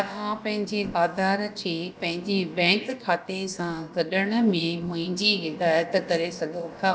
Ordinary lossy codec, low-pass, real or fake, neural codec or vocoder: none; none; fake; codec, 16 kHz, about 1 kbps, DyCAST, with the encoder's durations